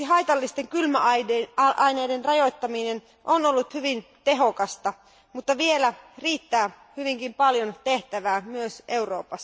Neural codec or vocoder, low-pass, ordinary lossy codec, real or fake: none; none; none; real